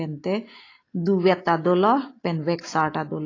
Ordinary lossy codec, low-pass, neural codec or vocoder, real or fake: AAC, 32 kbps; 7.2 kHz; none; real